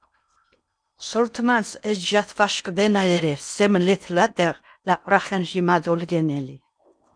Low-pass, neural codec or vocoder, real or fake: 9.9 kHz; codec, 16 kHz in and 24 kHz out, 0.8 kbps, FocalCodec, streaming, 65536 codes; fake